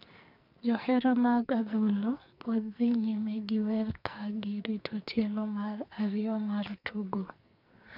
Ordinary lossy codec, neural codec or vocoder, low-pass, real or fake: none; codec, 44.1 kHz, 2.6 kbps, SNAC; 5.4 kHz; fake